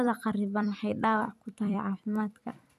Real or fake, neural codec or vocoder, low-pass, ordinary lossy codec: fake; vocoder, 44.1 kHz, 128 mel bands every 256 samples, BigVGAN v2; 14.4 kHz; none